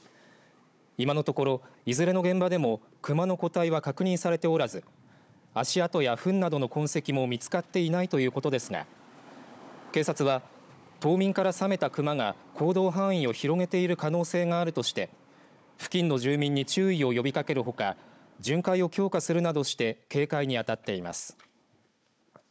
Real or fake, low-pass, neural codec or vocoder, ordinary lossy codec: fake; none; codec, 16 kHz, 16 kbps, FunCodec, trained on Chinese and English, 50 frames a second; none